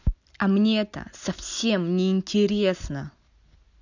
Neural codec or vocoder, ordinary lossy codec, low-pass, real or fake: none; none; 7.2 kHz; real